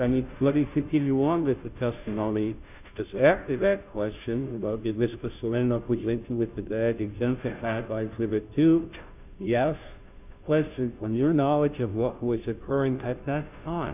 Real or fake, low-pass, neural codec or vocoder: fake; 3.6 kHz; codec, 16 kHz, 0.5 kbps, FunCodec, trained on Chinese and English, 25 frames a second